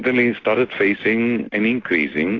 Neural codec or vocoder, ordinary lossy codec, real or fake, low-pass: none; AAC, 32 kbps; real; 7.2 kHz